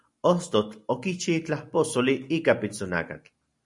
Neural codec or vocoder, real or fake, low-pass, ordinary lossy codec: none; real; 10.8 kHz; MP3, 96 kbps